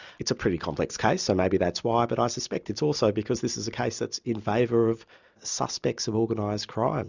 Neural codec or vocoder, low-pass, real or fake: none; 7.2 kHz; real